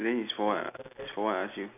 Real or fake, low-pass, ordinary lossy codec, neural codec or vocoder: real; 3.6 kHz; none; none